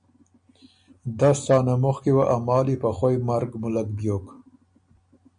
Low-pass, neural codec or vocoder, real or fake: 9.9 kHz; none; real